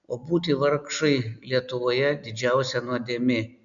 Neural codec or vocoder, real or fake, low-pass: none; real; 7.2 kHz